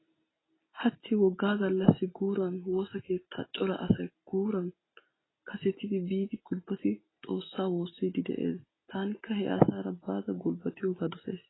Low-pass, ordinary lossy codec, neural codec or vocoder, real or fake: 7.2 kHz; AAC, 16 kbps; none; real